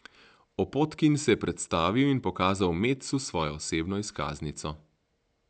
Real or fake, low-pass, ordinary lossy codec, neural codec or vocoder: real; none; none; none